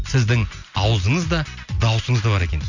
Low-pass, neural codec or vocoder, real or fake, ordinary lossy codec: 7.2 kHz; none; real; none